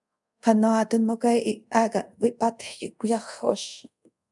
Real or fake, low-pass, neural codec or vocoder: fake; 10.8 kHz; codec, 24 kHz, 0.5 kbps, DualCodec